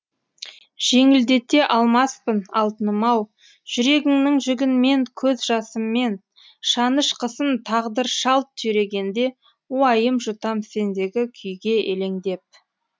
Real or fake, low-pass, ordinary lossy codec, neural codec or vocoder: real; none; none; none